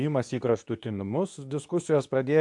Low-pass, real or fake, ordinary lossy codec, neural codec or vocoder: 10.8 kHz; fake; AAC, 64 kbps; codec, 24 kHz, 0.9 kbps, WavTokenizer, medium speech release version 2